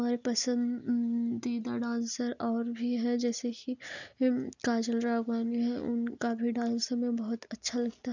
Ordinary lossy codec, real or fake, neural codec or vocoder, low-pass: none; real; none; 7.2 kHz